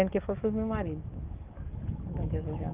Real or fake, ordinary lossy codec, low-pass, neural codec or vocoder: fake; Opus, 32 kbps; 3.6 kHz; codec, 44.1 kHz, 7.8 kbps, DAC